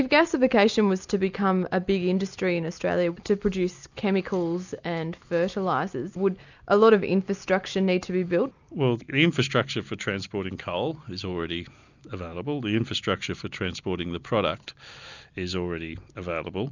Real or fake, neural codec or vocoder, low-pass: real; none; 7.2 kHz